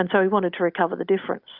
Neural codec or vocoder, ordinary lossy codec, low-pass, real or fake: none; Opus, 64 kbps; 5.4 kHz; real